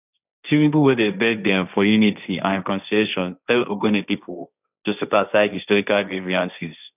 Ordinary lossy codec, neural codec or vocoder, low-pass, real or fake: none; codec, 16 kHz, 1.1 kbps, Voila-Tokenizer; 3.6 kHz; fake